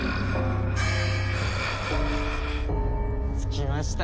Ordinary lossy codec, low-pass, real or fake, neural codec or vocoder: none; none; real; none